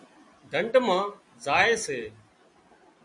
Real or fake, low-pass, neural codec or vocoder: real; 10.8 kHz; none